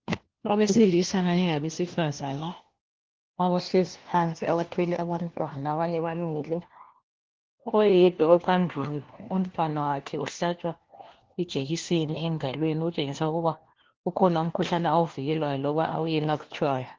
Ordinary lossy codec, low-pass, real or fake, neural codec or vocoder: Opus, 16 kbps; 7.2 kHz; fake; codec, 16 kHz, 1 kbps, FunCodec, trained on LibriTTS, 50 frames a second